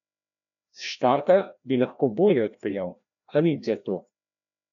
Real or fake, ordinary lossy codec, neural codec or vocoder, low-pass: fake; MP3, 96 kbps; codec, 16 kHz, 1 kbps, FreqCodec, larger model; 7.2 kHz